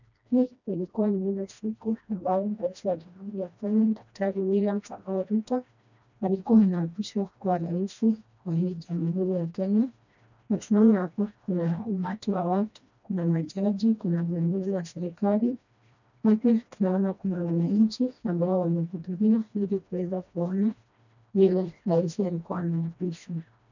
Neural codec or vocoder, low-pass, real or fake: codec, 16 kHz, 1 kbps, FreqCodec, smaller model; 7.2 kHz; fake